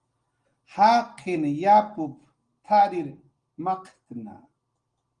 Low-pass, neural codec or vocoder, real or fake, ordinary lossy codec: 9.9 kHz; none; real; Opus, 24 kbps